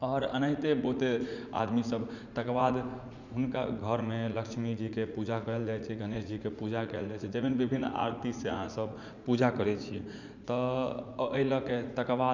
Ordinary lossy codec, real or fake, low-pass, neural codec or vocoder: none; fake; 7.2 kHz; vocoder, 44.1 kHz, 80 mel bands, Vocos